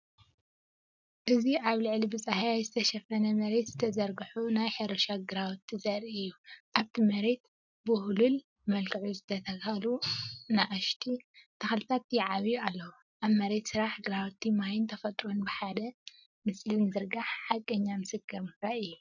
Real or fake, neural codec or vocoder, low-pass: real; none; 7.2 kHz